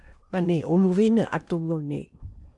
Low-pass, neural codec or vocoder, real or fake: 10.8 kHz; codec, 16 kHz in and 24 kHz out, 0.8 kbps, FocalCodec, streaming, 65536 codes; fake